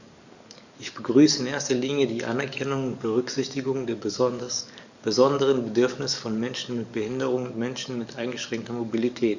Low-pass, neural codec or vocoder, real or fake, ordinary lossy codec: 7.2 kHz; codec, 44.1 kHz, 7.8 kbps, DAC; fake; none